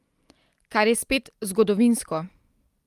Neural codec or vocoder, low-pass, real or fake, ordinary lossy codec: none; 14.4 kHz; real; Opus, 32 kbps